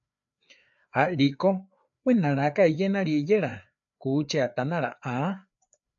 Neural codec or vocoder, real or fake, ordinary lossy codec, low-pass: codec, 16 kHz, 4 kbps, FreqCodec, larger model; fake; MP3, 64 kbps; 7.2 kHz